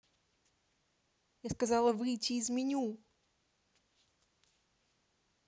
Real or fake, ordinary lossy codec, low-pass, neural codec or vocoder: real; none; none; none